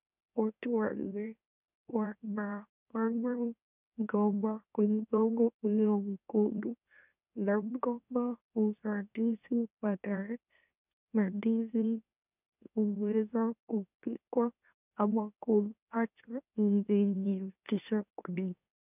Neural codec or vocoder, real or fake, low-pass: autoencoder, 44.1 kHz, a latent of 192 numbers a frame, MeloTTS; fake; 3.6 kHz